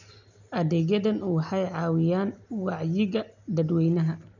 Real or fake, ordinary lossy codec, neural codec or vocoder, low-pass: real; none; none; 7.2 kHz